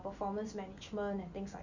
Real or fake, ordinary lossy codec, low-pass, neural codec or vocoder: real; none; 7.2 kHz; none